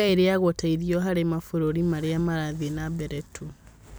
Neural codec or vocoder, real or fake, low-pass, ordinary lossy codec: none; real; none; none